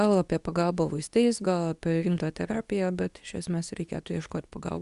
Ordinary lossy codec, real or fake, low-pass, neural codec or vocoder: Opus, 64 kbps; fake; 10.8 kHz; codec, 24 kHz, 0.9 kbps, WavTokenizer, medium speech release version 2